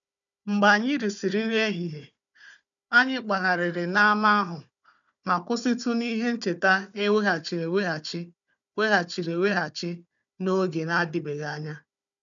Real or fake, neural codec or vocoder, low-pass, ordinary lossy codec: fake; codec, 16 kHz, 4 kbps, FunCodec, trained on Chinese and English, 50 frames a second; 7.2 kHz; none